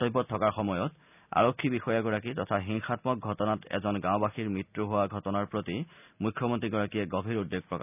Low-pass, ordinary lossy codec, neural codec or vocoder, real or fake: 3.6 kHz; none; none; real